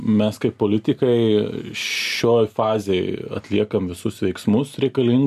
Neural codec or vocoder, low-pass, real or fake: none; 14.4 kHz; real